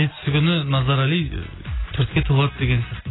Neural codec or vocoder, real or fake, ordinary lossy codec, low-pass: none; real; AAC, 16 kbps; 7.2 kHz